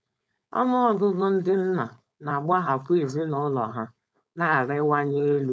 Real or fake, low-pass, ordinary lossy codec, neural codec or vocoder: fake; none; none; codec, 16 kHz, 4.8 kbps, FACodec